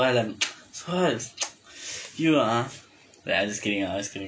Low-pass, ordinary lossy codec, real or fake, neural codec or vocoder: none; none; real; none